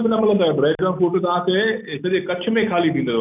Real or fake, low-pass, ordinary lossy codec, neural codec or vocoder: real; 3.6 kHz; none; none